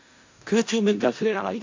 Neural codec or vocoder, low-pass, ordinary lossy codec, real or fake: codec, 16 kHz in and 24 kHz out, 0.4 kbps, LongCat-Audio-Codec, four codebook decoder; 7.2 kHz; AAC, 48 kbps; fake